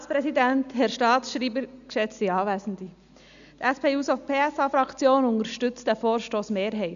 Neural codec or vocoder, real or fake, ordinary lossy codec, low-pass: none; real; none; 7.2 kHz